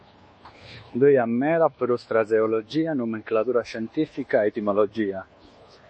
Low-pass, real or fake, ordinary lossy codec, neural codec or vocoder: 9.9 kHz; fake; MP3, 32 kbps; codec, 24 kHz, 1.2 kbps, DualCodec